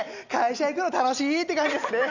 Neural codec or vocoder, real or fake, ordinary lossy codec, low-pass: none; real; none; 7.2 kHz